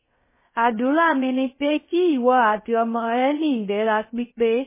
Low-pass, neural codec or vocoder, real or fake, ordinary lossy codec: 3.6 kHz; codec, 16 kHz, 0.3 kbps, FocalCodec; fake; MP3, 16 kbps